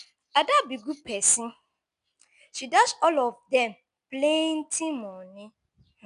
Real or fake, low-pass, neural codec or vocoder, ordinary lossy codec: real; 10.8 kHz; none; none